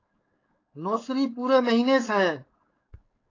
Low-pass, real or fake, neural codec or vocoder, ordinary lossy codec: 7.2 kHz; fake; codec, 16 kHz, 4.8 kbps, FACodec; AAC, 32 kbps